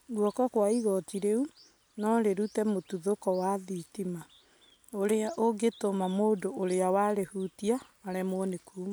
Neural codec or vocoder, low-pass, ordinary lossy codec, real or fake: none; none; none; real